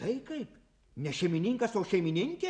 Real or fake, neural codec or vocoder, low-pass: real; none; 9.9 kHz